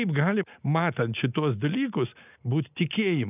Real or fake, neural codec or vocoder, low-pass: real; none; 3.6 kHz